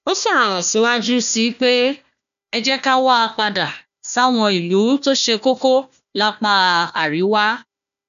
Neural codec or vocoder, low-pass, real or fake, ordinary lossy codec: codec, 16 kHz, 1 kbps, FunCodec, trained on Chinese and English, 50 frames a second; 7.2 kHz; fake; none